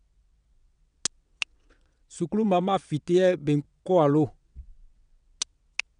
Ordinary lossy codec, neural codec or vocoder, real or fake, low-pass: none; vocoder, 22.05 kHz, 80 mel bands, WaveNeXt; fake; 9.9 kHz